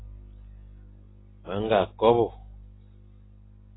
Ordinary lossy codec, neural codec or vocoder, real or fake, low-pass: AAC, 16 kbps; none; real; 7.2 kHz